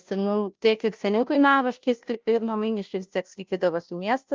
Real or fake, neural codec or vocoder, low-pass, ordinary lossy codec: fake; codec, 16 kHz, 0.5 kbps, FunCodec, trained on Chinese and English, 25 frames a second; 7.2 kHz; Opus, 32 kbps